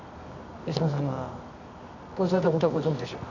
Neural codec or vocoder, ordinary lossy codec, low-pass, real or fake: codec, 24 kHz, 0.9 kbps, WavTokenizer, medium music audio release; none; 7.2 kHz; fake